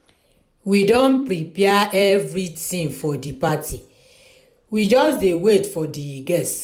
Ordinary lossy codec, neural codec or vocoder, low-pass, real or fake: none; vocoder, 44.1 kHz, 128 mel bands every 256 samples, BigVGAN v2; 19.8 kHz; fake